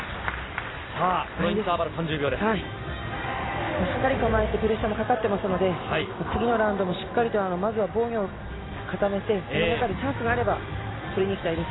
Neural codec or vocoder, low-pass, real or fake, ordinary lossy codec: none; 7.2 kHz; real; AAC, 16 kbps